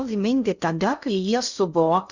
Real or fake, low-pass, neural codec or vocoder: fake; 7.2 kHz; codec, 16 kHz in and 24 kHz out, 0.6 kbps, FocalCodec, streaming, 2048 codes